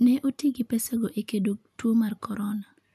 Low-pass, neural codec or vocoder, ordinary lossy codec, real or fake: 14.4 kHz; none; none; real